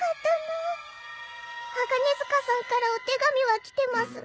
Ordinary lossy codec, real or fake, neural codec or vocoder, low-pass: none; real; none; none